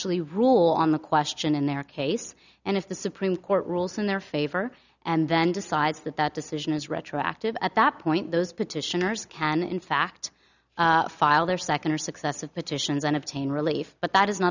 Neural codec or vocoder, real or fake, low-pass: none; real; 7.2 kHz